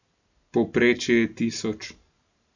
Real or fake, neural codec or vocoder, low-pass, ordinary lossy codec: real; none; 7.2 kHz; none